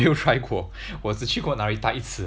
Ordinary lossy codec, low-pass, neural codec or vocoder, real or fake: none; none; none; real